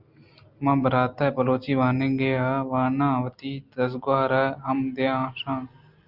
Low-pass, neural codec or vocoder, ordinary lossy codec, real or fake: 5.4 kHz; none; Opus, 24 kbps; real